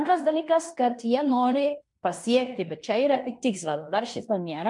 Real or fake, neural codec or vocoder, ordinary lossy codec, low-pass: fake; codec, 16 kHz in and 24 kHz out, 0.9 kbps, LongCat-Audio-Codec, fine tuned four codebook decoder; MP3, 64 kbps; 10.8 kHz